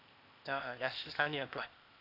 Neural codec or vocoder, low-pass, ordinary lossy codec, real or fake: codec, 16 kHz, 0.8 kbps, ZipCodec; 5.4 kHz; none; fake